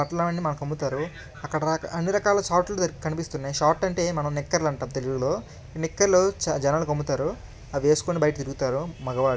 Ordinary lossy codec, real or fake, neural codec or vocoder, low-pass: none; real; none; none